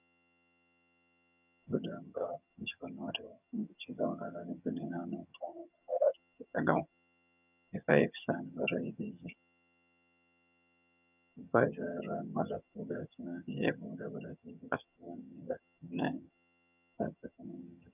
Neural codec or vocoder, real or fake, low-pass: vocoder, 22.05 kHz, 80 mel bands, HiFi-GAN; fake; 3.6 kHz